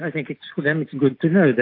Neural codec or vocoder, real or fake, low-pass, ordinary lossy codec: none; real; 5.4 kHz; AAC, 32 kbps